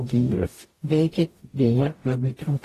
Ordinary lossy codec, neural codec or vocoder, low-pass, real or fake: AAC, 48 kbps; codec, 44.1 kHz, 0.9 kbps, DAC; 14.4 kHz; fake